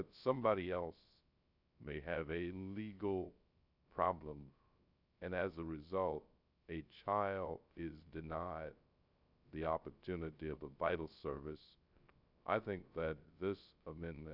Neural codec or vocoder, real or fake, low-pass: codec, 16 kHz, 0.3 kbps, FocalCodec; fake; 5.4 kHz